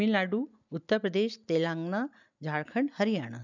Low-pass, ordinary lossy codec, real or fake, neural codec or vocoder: 7.2 kHz; none; real; none